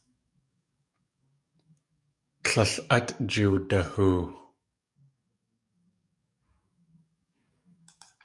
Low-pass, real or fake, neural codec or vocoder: 10.8 kHz; fake; codec, 44.1 kHz, 7.8 kbps, DAC